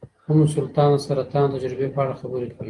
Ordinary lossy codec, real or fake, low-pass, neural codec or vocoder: Opus, 24 kbps; real; 10.8 kHz; none